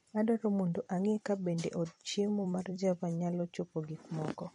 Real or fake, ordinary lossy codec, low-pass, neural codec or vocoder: fake; MP3, 48 kbps; 19.8 kHz; vocoder, 44.1 kHz, 128 mel bands every 256 samples, BigVGAN v2